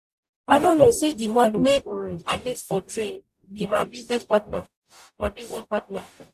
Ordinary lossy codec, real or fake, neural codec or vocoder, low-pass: none; fake; codec, 44.1 kHz, 0.9 kbps, DAC; 14.4 kHz